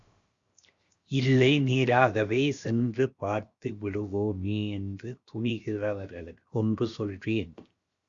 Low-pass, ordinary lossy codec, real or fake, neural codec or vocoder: 7.2 kHz; Opus, 64 kbps; fake; codec, 16 kHz, 0.7 kbps, FocalCodec